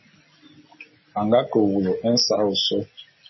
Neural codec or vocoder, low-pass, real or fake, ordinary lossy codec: none; 7.2 kHz; real; MP3, 24 kbps